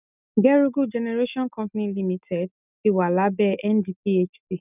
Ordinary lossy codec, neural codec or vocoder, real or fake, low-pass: none; none; real; 3.6 kHz